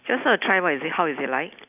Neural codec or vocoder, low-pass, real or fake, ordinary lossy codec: none; 3.6 kHz; real; none